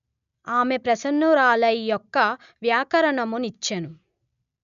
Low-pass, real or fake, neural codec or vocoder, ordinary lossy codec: 7.2 kHz; real; none; none